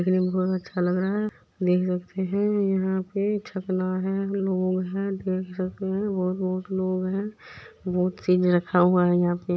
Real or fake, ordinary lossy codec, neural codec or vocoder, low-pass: real; none; none; none